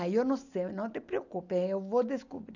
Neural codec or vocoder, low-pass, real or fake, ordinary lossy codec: none; 7.2 kHz; real; none